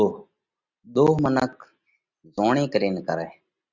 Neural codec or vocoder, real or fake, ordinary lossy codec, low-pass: none; real; Opus, 64 kbps; 7.2 kHz